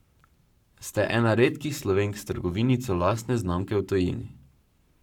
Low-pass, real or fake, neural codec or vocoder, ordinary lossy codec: 19.8 kHz; fake; codec, 44.1 kHz, 7.8 kbps, Pupu-Codec; none